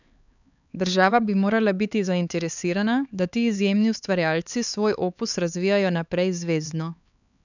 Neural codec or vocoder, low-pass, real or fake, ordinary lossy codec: codec, 16 kHz, 4 kbps, X-Codec, HuBERT features, trained on LibriSpeech; 7.2 kHz; fake; none